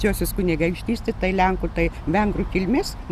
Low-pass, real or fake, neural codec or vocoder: 14.4 kHz; real; none